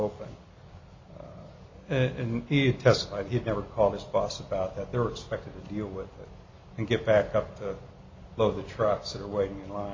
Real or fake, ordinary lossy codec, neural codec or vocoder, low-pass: real; MP3, 32 kbps; none; 7.2 kHz